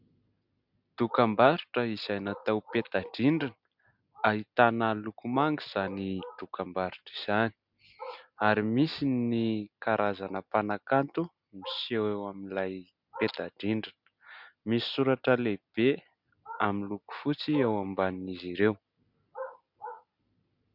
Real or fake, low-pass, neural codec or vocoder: real; 5.4 kHz; none